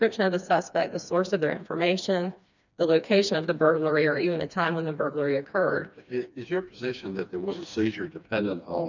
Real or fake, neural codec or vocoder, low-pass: fake; codec, 16 kHz, 2 kbps, FreqCodec, smaller model; 7.2 kHz